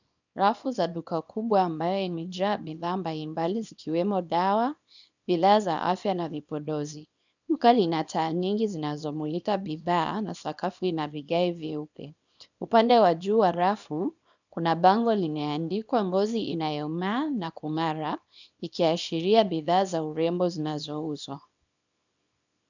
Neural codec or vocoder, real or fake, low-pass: codec, 24 kHz, 0.9 kbps, WavTokenizer, small release; fake; 7.2 kHz